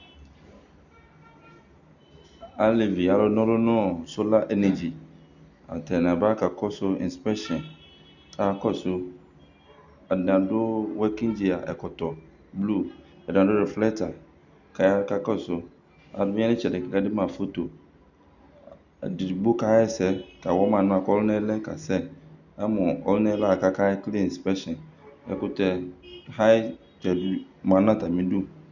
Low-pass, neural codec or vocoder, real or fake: 7.2 kHz; none; real